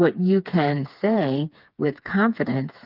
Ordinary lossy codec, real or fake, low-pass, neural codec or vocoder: Opus, 24 kbps; fake; 5.4 kHz; codec, 16 kHz, 4 kbps, FreqCodec, smaller model